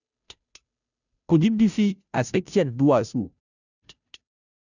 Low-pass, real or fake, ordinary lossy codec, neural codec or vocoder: 7.2 kHz; fake; none; codec, 16 kHz, 0.5 kbps, FunCodec, trained on Chinese and English, 25 frames a second